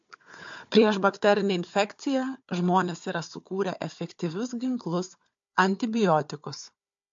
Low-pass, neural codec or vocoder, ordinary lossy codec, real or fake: 7.2 kHz; codec, 16 kHz, 4 kbps, FunCodec, trained on Chinese and English, 50 frames a second; MP3, 48 kbps; fake